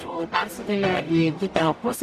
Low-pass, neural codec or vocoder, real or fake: 14.4 kHz; codec, 44.1 kHz, 0.9 kbps, DAC; fake